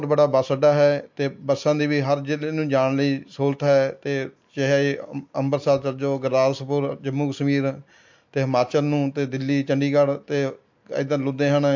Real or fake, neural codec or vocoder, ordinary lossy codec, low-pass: real; none; MP3, 48 kbps; 7.2 kHz